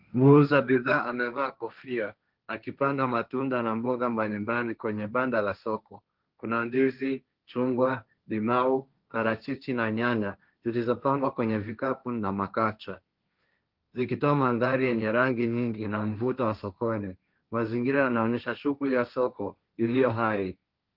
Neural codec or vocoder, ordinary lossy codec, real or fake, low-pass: codec, 16 kHz, 1.1 kbps, Voila-Tokenizer; Opus, 24 kbps; fake; 5.4 kHz